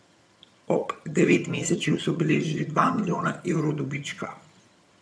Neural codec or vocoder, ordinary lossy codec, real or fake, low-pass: vocoder, 22.05 kHz, 80 mel bands, HiFi-GAN; none; fake; none